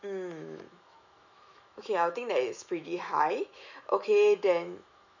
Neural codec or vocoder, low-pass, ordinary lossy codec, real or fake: autoencoder, 48 kHz, 128 numbers a frame, DAC-VAE, trained on Japanese speech; 7.2 kHz; none; fake